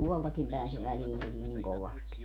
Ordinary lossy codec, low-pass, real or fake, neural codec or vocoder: none; 19.8 kHz; fake; autoencoder, 48 kHz, 128 numbers a frame, DAC-VAE, trained on Japanese speech